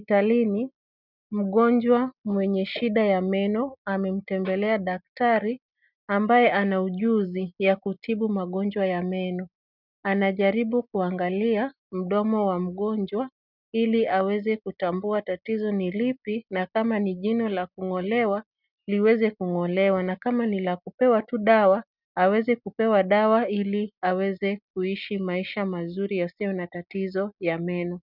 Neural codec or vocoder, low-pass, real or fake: none; 5.4 kHz; real